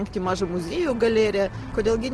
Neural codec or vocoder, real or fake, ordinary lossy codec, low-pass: none; real; Opus, 16 kbps; 10.8 kHz